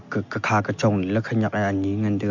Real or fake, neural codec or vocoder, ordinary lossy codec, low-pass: real; none; MP3, 48 kbps; 7.2 kHz